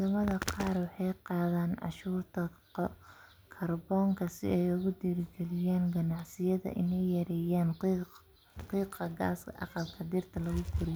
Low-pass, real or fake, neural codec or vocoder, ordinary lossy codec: none; real; none; none